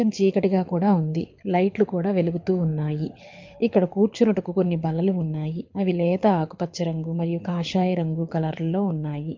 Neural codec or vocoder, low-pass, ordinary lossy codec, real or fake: codec, 24 kHz, 6 kbps, HILCodec; 7.2 kHz; MP3, 48 kbps; fake